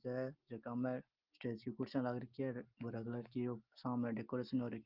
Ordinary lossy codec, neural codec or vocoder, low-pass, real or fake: Opus, 32 kbps; none; 5.4 kHz; real